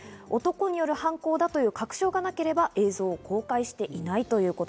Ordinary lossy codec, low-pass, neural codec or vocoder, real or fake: none; none; none; real